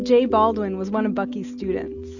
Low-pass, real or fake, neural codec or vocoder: 7.2 kHz; real; none